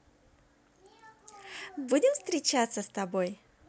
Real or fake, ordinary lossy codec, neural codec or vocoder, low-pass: real; none; none; none